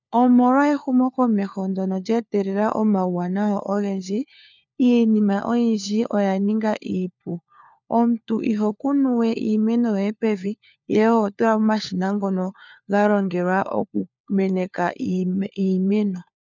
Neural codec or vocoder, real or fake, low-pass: codec, 16 kHz, 4 kbps, FunCodec, trained on LibriTTS, 50 frames a second; fake; 7.2 kHz